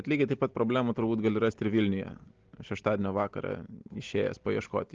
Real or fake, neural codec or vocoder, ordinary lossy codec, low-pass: real; none; Opus, 16 kbps; 7.2 kHz